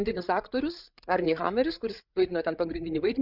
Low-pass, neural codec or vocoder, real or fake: 5.4 kHz; codec, 16 kHz, 8 kbps, FreqCodec, larger model; fake